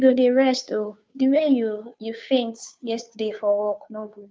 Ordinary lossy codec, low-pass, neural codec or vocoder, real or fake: none; none; codec, 16 kHz, 2 kbps, FunCodec, trained on Chinese and English, 25 frames a second; fake